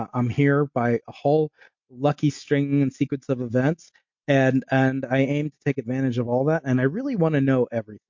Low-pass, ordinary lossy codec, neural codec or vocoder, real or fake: 7.2 kHz; MP3, 48 kbps; none; real